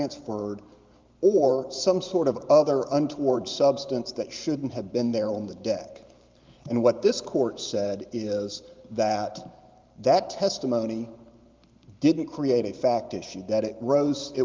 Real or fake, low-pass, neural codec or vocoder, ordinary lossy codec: fake; 7.2 kHz; vocoder, 44.1 kHz, 128 mel bands every 512 samples, BigVGAN v2; Opus, 32 kbps